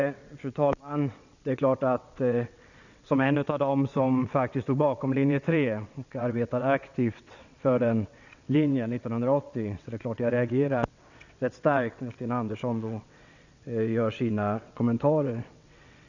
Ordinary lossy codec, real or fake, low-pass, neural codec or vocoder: none; fake; 7.2 kHz; vocoder, 22.05 kHz, 80 mel bands, WaveNeXt